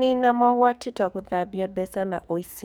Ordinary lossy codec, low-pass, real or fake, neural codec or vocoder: none; none; fake; codec, 44.1 kHz, 2.6 kbps, SNAC